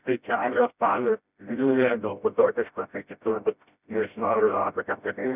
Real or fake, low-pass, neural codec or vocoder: fake; 3.6 kHz; codec, 16 kHz, 0.5 kbps, FreqCodec, smaller model